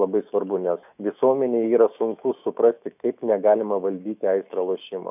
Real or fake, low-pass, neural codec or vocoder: fake; 3.6 kHz; autoencoder, 48 kHz, 128 numbers a frame, DAC-VAE, trained on Japanese speech